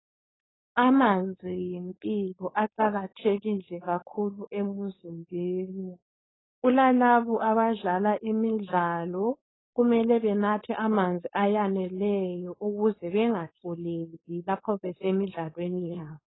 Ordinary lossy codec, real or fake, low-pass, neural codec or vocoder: AAC, 16 kbps; fake; 7.2 kHz; codec, 16 kHz, 4.8 kbps, FACodec